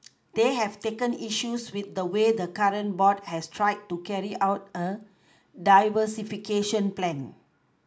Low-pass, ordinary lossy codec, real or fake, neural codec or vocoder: none; none; real; none